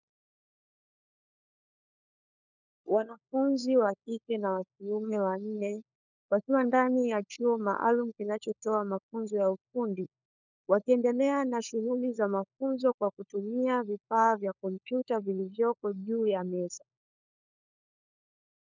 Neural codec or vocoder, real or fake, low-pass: codec, 16 kHz, 4 kbps, FunCodec, trained on LibriTTS, 50 frames a second; fake; 7.2 kHz